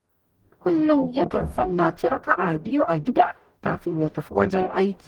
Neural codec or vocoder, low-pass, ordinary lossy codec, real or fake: codec, 44.1 kHz, 0.9 kbps, DAC; 19.8 kHz; Opus, 24 kbps; fake